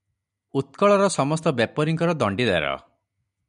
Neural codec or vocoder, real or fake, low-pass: none; real; 10.8 kHz